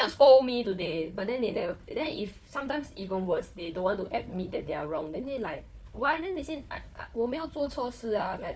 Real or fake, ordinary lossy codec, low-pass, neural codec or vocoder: fake; none; none; codec, 16 kHz, 4 kbps, FunCodec, trained on Chinese and English, 50 frames a second